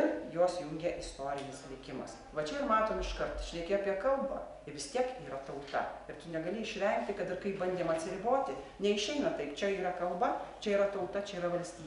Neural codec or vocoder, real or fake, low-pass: none; real; 10.8 kHz